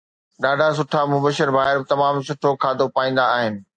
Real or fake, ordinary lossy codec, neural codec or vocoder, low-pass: real; AAC, 64 kbps; none; 9.9 kHz